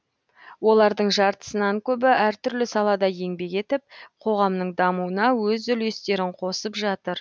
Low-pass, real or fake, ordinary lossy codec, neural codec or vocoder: none; real; none; none